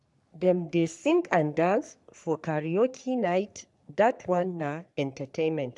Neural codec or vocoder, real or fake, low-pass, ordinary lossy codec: codec, 44.1 kHz, 3.4 kbps, Pupu-Codec; fake; 10.8 kHz; none